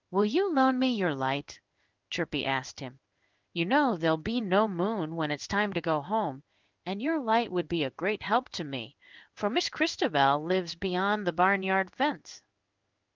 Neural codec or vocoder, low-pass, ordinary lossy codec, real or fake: codec, 16 kHz in and 24 kHz out, 1 kbps, XY-Tokenizer; 7.2 kHz; Opus, 24 kbps; fake